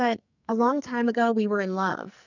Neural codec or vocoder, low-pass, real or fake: codec, 44.1 kHz, 2.6 kbps, SNAC; 7.2 kHz; fake